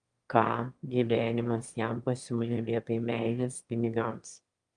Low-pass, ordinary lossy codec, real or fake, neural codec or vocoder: 9.9 kHz; Opus, 32 kbps; fake; autoencoder, 22.05 kHz, a latent of 192 numbers a frame, VITS, trained on one speaker